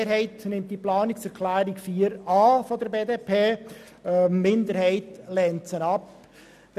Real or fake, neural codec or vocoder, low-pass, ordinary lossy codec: real; none; 14.4 kHz; none